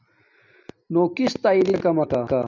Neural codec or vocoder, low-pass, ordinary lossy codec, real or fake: none; 7.2 kHz; MP3, 64 kbps; real